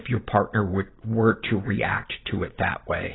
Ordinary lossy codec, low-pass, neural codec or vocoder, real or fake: AAC, 16 kbps; 7.2 kHz; none; real